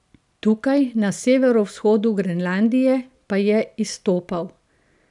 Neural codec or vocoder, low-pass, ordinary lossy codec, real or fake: none; 10.8 kHz; none; real